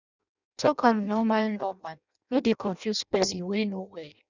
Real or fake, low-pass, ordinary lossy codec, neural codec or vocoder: fake; 7.2 kHz; none; codec, 16 kHz in and 24 kHz out, 0.6 kbps, FireRedTTS-2 codec